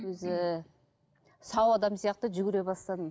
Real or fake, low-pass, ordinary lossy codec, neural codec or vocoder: real; none; none; none